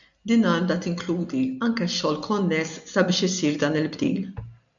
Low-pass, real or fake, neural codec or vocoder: 7.2 kHz; real; none